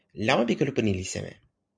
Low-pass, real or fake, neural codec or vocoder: 9.9 kHz; real; none